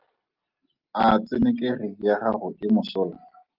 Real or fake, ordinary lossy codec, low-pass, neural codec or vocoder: real; Opus, 32 kbps; 5.4 kHz; none